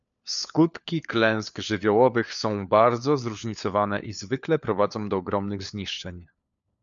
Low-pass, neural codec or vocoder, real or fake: 7.2 kHz; codec, 16 kHz, 4 kbps, FunCodec, trained on LibriTTS, 50 frames a second; fake